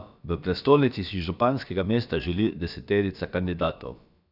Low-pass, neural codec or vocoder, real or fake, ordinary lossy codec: 5.4 kHz; codec, 16 kHz, about 1 kbps, DyCAST, with the encoder's durations; fake; none